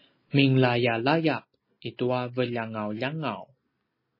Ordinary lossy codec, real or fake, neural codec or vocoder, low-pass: MP3, 24 kbps; real; none; 5.4 kHz